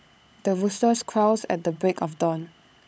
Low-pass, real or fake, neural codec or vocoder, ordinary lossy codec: none; fake; codec, 16 kHz, 16 kbps, FunCodec, trained on LibriTTS, 50 frames a second; none